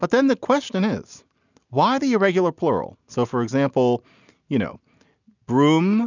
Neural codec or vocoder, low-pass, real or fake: none; 7.2 kHz; real